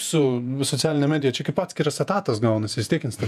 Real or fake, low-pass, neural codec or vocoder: fake; 14.4 kHz; vocoder, 48 kHz, 128 mel bands, Vocos